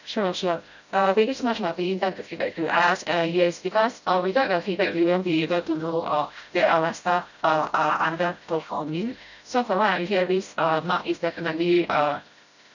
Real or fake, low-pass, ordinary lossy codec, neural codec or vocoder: fake; 7.2 kHz; none; codec, 16 kHz, 0.5 kbps, FreqCodec, smaller model